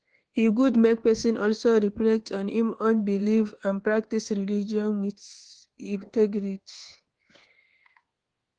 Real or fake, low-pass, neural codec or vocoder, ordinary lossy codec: fake; 9.9 kHz; codec, 24 kHz, 1.2 kbps, DualCodec; Opus, 16 kbps